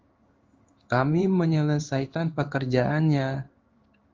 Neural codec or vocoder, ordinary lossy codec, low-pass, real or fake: codec, 16 kHz in and 24 kHz out, 1 kbps, XY-Tokenizer; Opus, 32 kbps; 7.2 kHz; fake